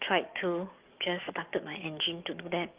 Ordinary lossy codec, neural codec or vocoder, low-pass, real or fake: Opus, 32 kbps; codec, 44.1 kHz, 7.8 kbps, DAC; 3.6 kHz; fake